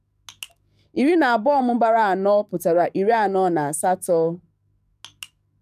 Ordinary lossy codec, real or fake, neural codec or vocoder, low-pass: none; fake; codec, 44.1 kHz, 7.8 kbps, DAC; 14.4 kHz